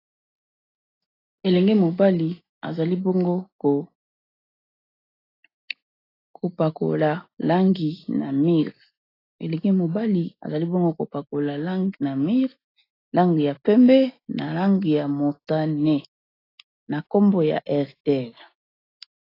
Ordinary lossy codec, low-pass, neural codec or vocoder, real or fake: AAC, 24 kbps; 5.4 kHz; none; real